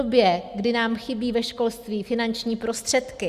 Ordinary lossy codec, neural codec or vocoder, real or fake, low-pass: Opus, 64 kbps; none; real; 14.4 kHz